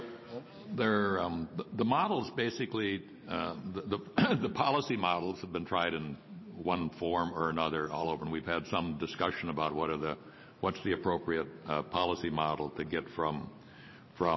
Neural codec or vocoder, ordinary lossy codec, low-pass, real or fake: none; MP3, 24 kbps; 7.2 kHz; real